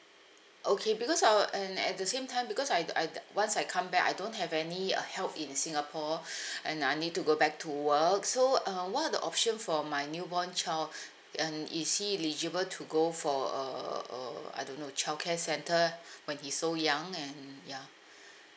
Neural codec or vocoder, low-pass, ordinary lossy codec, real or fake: none; none; none; real